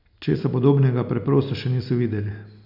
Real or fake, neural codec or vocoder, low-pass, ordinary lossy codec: real; none; 5.4 kHz; none